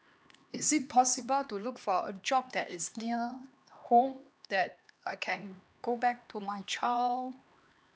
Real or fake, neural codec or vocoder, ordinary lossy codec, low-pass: fake; codec, 16 kHz, 2 kbps, X-Codec, HuBERT features, trained on LibriSpeech; none; none